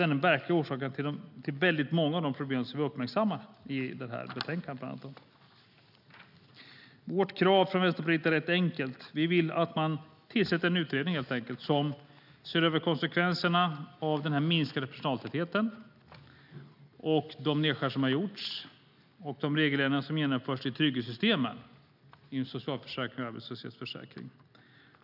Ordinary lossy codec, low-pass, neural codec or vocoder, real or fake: none; 5.4 kHz; none; real